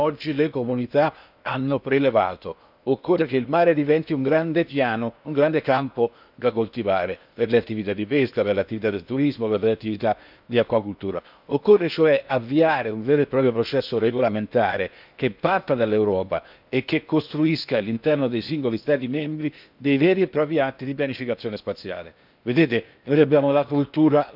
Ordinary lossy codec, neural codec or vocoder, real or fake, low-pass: none; codec, 16 kHz in and 24 kHz out, 0.8 kbps, FocalCodec, streaming, 65536 codes; fake; 5.4 kHz